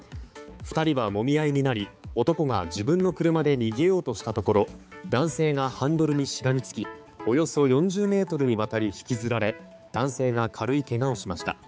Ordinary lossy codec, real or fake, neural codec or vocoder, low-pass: none; fake; codec, 16 kHz, 4 kbps, X-Codec, HuBERT features, trained on balanced general audio; none